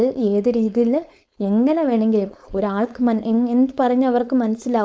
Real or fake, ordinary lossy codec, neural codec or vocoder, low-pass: fake; none; codec, 16 kHz, 4.8 kbps, FACodec; none